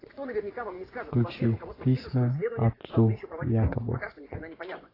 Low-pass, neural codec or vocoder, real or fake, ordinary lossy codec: 5.4 kHz; vocoder, 24 kHz, 100 mel bands, Vocos; fake; AAC, 24 kbps